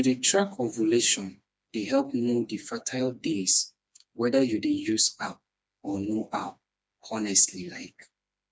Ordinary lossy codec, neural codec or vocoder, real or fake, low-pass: none; codec, 16 kHz, 2 kbps, FreqCodec, smaller model; fake; none